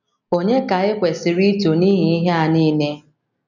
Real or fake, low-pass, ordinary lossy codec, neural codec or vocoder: real; 7.2 kHz; none; none